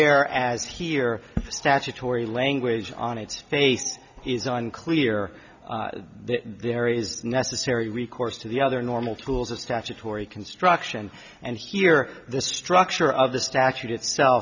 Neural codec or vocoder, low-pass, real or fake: none; 7.2 kHz; real